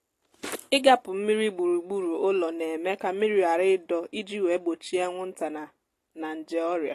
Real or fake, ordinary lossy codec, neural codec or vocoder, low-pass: real; AAC, 48 kbps; none; 14.4 kHz